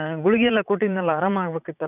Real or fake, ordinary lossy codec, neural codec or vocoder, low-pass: fake; none; vocoder, 44.1 kHz, 80 mel bands, Vocos; 3.6 kHz